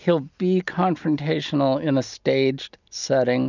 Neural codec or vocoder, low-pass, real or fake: none; 7.2 kHz; real